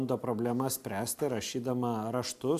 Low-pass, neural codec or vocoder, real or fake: 14.4 kHz; none; real